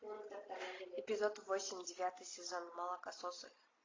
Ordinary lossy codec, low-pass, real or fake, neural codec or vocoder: AAC, 32 kbps; 7.2 kHz; real; none